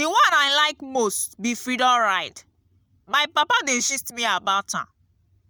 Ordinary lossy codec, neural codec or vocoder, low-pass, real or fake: none; none; none; real